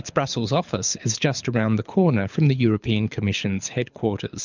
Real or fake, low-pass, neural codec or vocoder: fake; 7.2 kHz; codec, 24 kHz, 6 kbps, HILCodec